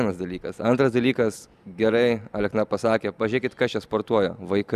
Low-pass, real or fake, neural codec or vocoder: 14.4 kHz; real; none